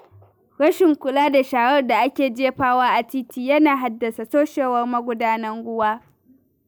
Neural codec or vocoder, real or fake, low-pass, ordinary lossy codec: none; real; none; none